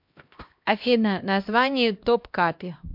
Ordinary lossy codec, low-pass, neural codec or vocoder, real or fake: MP3, 48 kbps; 5.4 kHz; codec, 16 kHz, 1 kbps, X-Codec, HuBERT features, trained on LibriSpeech; fake